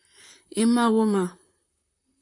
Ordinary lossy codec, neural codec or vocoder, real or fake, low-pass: AAC, 64 kbps; vocoder, 44.1 kHz, 128 mel bands, Pupu-Vocoder; fake; 10.8 kHz